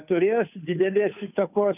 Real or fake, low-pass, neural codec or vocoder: fake; 3.6 kHz; codec, 16 kHz, 2 kbps, FunCodec, trained on Chinese and English, 25 frames a second